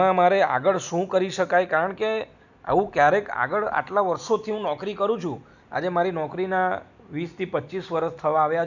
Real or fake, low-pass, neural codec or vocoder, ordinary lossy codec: real; 7.2 kHz; none; none